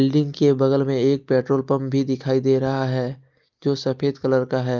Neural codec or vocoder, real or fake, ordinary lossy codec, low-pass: none; real; Opus, 32 kbps; 7.2 kHz